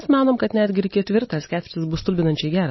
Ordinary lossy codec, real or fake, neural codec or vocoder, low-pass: MP3, 24 kbps; real; none; 7.2 kHz